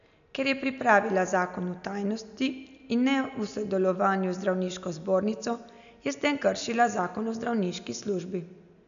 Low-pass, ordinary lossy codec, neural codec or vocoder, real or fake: 7.2 kHz; none; none; real